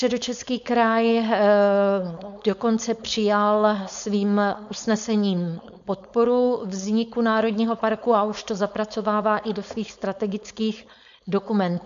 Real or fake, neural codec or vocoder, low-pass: fake; codec, 16 kHz, 4.8 kbps, FACodec; 7.2 kHz